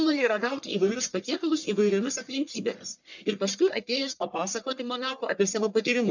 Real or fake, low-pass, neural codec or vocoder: fake; 7.2 kHz; codec, 44.1 kHz, 1.7 kbps, Pupu-Codec